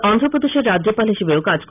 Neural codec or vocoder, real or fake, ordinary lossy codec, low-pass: none; real; none; 3.6 kHz